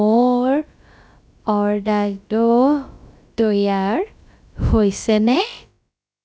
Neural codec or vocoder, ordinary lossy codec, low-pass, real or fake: codec, 16 kHz, about 1 kbps, DyCAST, with the encoder's durations; none; none; fake